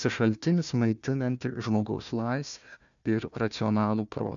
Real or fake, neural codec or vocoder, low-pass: fake; codec, 16 kHz, 1 kbps, FunCodec, trained on Chinese and English, 50 frames a second; 7.2 kHz